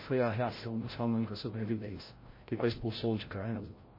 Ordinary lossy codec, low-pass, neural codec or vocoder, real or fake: MP3, 24 kbps; 5.4 kHz; codec, 16 kHz, 0.5 kbps, FreqCodec, larger model; fake